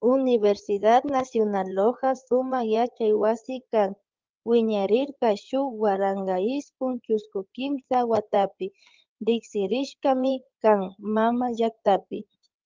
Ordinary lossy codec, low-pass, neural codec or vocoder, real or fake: Opus, 32 kbps; 7.2 kHz; codec, 16 kHz in and 24 kHz out, 2.2 kbps, FireRedTTS-2 codec; fake